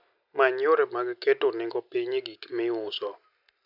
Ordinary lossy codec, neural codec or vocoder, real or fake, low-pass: none; none; real; 5.4 kHz